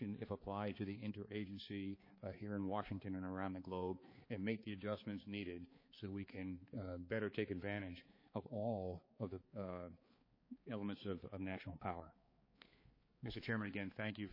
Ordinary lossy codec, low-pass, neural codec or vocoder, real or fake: MP3, 24 kbps; 5.4 kHz; codec, 16 kHz, 4 kbps, X-Codec, HuBERT features, trained on balanced general audio; fake